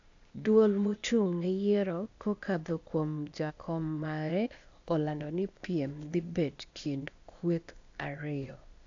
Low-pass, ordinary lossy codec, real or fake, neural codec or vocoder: 7.2 kHz; AAC, 64 kbps; fake; codec, 16 kHz, 0.8 kbps, ZipCodec